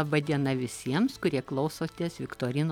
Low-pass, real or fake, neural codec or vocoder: 19.8 kHz; real; none